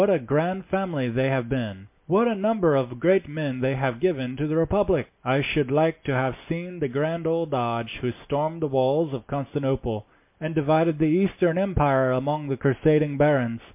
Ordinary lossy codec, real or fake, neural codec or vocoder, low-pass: MP3, 32 kbps; real; none; 3.6 kHz